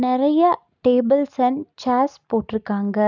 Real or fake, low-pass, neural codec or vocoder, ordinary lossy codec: real; 7.2 kHz; none; none